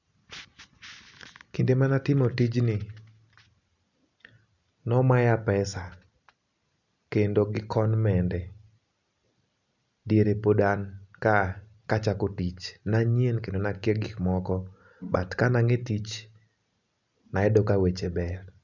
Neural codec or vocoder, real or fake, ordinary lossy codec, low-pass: none; real; none; 7.2 kHz